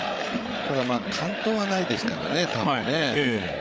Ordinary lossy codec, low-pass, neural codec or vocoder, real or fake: none; none; codec, 16 kHz, 8 kbps, FreqCodec, larger model; fake